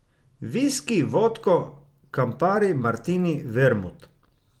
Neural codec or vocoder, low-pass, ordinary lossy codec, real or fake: vocoder, 44.1 kHz, 128 mel bands every 256 samples, BigVGAN v2; 19.8 kHz; Opus, 24 kbps; fake